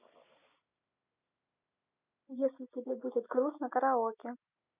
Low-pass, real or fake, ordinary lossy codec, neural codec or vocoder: 3.6 kHz; real; none; none